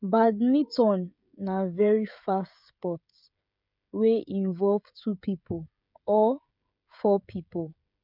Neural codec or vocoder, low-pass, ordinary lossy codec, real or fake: codec, 16 kHz, 16 kbps, FreqCodec, smaller model; 5.4 kHz; MP3, 48 kbps; fake